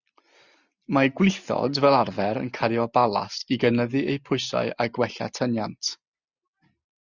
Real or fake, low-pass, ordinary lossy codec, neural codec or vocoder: real; 7.2 kHz; Opus, 64 kbps; none